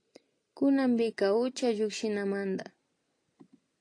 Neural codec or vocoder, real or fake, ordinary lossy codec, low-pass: none; real; AAC, 48 kbps; 9.9 kHz